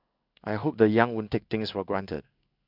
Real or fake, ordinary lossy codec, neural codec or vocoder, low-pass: fake; none; codec, 16 kHz in and 24 kHz out, 1 kbps, XY-Tokenizer; 5.4 kHz